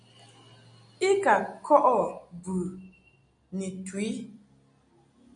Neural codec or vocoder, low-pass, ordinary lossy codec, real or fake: none; 9.9 kHz; MP3, 48 kbps; real